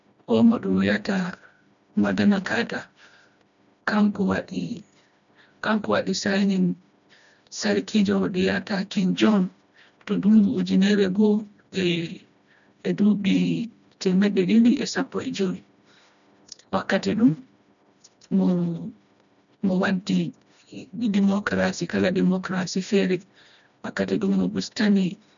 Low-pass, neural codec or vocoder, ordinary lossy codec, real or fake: 7.2 kHz; codec, 16 kHz, 1 kbps, FreqCodec, smaller model; none; fake